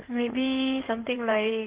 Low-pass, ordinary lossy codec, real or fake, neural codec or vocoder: 3.6 kHz; Opus, 16 kbps; fake; codec, 16 kHz in and 24 kHz out, 2.2 kbps, FireRedTTS-2 codec